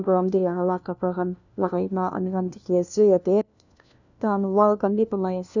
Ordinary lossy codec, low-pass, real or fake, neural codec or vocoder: none; 7.2 kHz; fake; codec, 16 kHz, 1 kbps, FunCodec, trained on LibriTTS, 50 frames a second